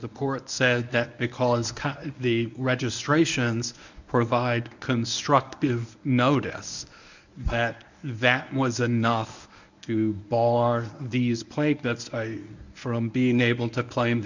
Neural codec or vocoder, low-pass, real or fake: codec, 24 kHz, 0.9 kbps, WavTokenizer, medium speech release version 1; 7.2 kHz; fake